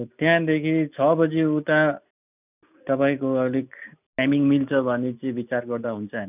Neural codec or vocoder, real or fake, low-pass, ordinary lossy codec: none; real; 3.6 kHz; none